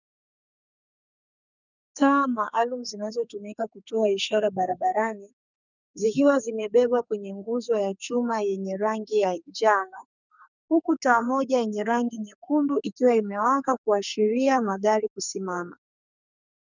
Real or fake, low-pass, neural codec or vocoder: fake; 7.2 kHz; codec, 44.1 kHz, 2.6 kbps, SNAC